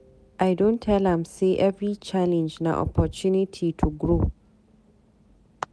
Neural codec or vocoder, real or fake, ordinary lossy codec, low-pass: none; real; none; none